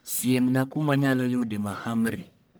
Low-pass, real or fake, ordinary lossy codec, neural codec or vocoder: none; fake; none; codec, 44.1 kHz, 1.7 kbps, Pupu-Codec